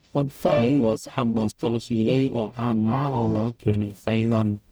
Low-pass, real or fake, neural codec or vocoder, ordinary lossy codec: none; fake; codec, 44.1 kHz, 0.9 kbps, DAC; none